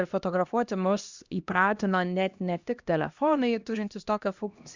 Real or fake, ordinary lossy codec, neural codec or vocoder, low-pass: fake; Opus, 64 kbps; codec, 16 kHz, 1 kbps, X-Codec, HuBERT features, trained on LibriSpeech; 7.2 kHz